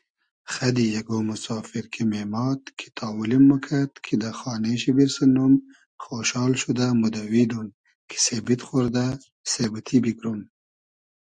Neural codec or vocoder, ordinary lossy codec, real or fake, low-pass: none; Opus, 64 kbps; real; 9.9 kHz